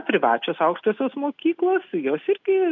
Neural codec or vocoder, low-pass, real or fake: none; 7.2 kHz; real